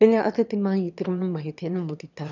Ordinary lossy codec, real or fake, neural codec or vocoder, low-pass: none; fake; autoencoder, 22.05 kHz, a latent of 192 numbers a frame, VITS, trained on one speaker; 7.2 kHz